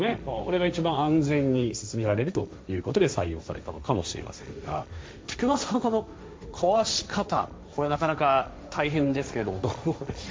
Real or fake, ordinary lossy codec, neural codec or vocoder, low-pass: fake; none; codec, 16 kHz, 1.1 kbps, Voila-Tokenizer; none